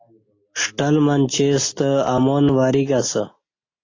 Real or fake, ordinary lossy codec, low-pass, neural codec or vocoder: real; AAC, 32 kbps; 7.2 kHz; none